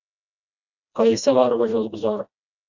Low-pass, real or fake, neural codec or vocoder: 7.2 kHz; fake; codec, 16 kHz, 1 kbps, FreqCodec, smaller model